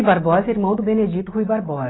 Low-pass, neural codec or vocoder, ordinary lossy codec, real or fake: 7.2 kHz; none; AAC, 16 kbps; real